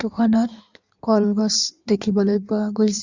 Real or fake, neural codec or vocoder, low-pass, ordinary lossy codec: fake; codec, 16 kHz in and 24 kHz out, 1.1 kbps, FireRedTTS-2 codec; 7.2 kHz; Opus, 64 kbps